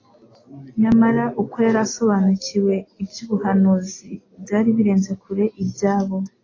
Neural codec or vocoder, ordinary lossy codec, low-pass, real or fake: none; AAC, 32 kbps; 7.2 kHz; real